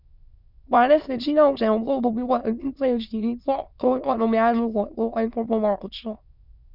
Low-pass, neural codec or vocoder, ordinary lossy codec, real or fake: 5.4 kHz; autoencoder, 22.05 kHz, a latent of 192 numbers a frame, VITS, trained on many speakers; none; fake